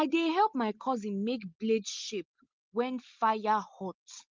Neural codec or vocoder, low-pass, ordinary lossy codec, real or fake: none; 7.2 kHz; Opus, 32 kbps; real